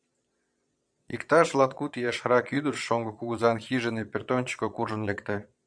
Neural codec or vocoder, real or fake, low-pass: vocoder, 22.05 kHz, 80 mel bands, Vocos; fake; 9.9 kHz